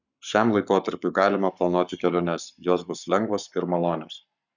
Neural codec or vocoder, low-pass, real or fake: codec, 44.1 kHz, 7.8 kbps, Pupu-Codec; 7.2 kHz; fake